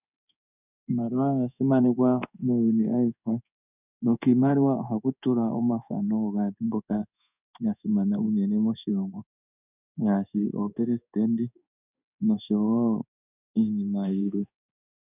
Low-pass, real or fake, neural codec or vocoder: 3.6 kHz; fake; codec, 16 kHz in and 24 kHz out, 1 kbps, XY-Tokenizer